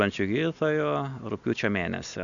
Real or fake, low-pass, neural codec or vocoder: real; 7.2 kHz; none